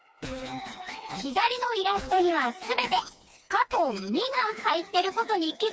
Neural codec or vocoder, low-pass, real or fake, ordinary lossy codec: codec, 16 kHz, 2 kbps, FreqCodec, smaller model; none; fake; none